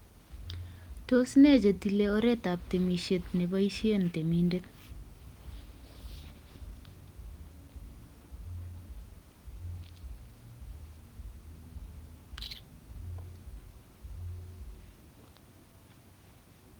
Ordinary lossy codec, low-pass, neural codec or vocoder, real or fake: Opus, 24 kbps; 19.8 kHz; none; real